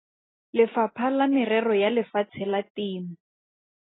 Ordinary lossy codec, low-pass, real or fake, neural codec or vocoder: AAC, 16 kbps; 7.2 kHz; real; none